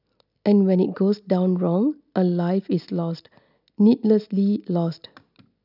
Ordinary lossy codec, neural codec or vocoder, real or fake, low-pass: none; none; real; 5.4 kHz